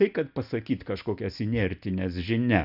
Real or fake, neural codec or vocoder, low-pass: real; none; 5.4 kHz